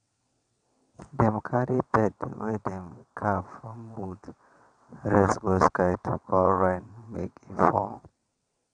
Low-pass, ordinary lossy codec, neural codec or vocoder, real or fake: 9.9 kHz; none; vocoder, 22.05 kHz, 80 mel bands, WaveNeXt; fake